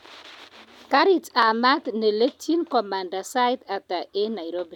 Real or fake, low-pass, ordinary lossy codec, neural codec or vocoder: real; 19.8 kHz; none; none